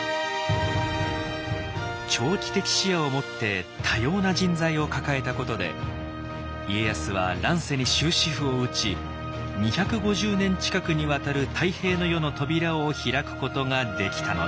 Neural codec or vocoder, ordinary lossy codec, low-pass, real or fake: none; none; none; real